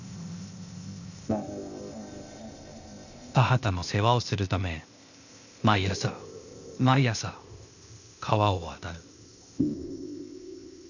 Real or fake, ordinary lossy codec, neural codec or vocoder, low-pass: fake; none; codec, 16 kHz, 0.8 kbps, ZipCodec; 7.2 kHz